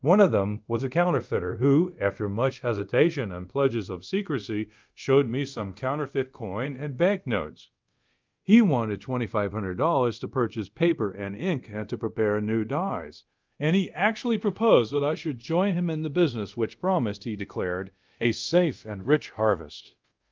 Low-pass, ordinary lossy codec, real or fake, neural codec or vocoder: 7.2 kHz; Opus, 24 kbps; fake; codec, 24 kHz, 0.5 kbps, DualCodec